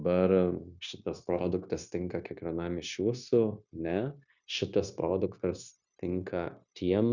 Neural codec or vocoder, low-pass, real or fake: codec, 16 kHz, 0.9 kbps, LongCat-Audio-Codec; 7.2 kHz; fake